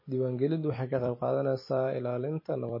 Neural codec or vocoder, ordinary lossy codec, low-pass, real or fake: vocoder, 44.1 kHz, 128 mel bands every 256 samples, BigVGAN v2; MP3, 24 kbps; 5.4 kHz; fake